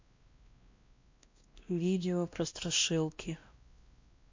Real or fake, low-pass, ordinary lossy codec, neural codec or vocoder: fake; 7.2 kHz; MP3, 48 kbps; codec, 16 kHz, 1 kbps, X-Codec, WavLM features, trained on Multilingual LibriSpeech